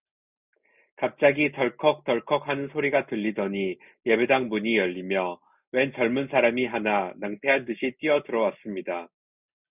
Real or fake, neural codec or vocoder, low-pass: real; none; 3.6 kHz